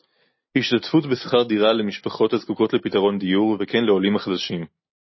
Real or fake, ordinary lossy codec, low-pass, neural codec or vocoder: real; MP3, 24 kbps; 7.2 kHz; none